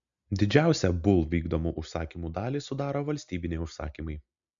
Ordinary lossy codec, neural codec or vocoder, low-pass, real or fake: MP3, 64 kbps; none; 7.2 kHz; real